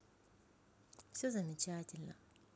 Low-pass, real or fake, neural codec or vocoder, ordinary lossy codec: none; real; none; none